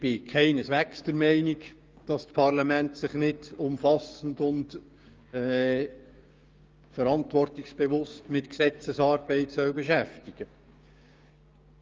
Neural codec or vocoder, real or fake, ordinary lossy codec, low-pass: codec, 16 kHz, 6 kbps, DAC; fake; Opus, 24 kbps; 7.2 kHz